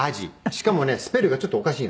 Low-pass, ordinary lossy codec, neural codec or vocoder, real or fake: none; none; none; real